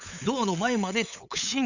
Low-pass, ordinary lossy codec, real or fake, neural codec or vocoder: 7.2 kHz; none; fake; codec, 16 kHz, 8 kbps, FunCodec, trained on LibriTTS, 25 frames a second